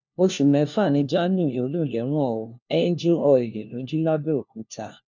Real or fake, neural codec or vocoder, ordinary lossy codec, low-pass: fake; codec, 16 kHz, 1 kbps, FunCodec, trained on LibriTTS, 50 frames a second; none; 7.2 kHz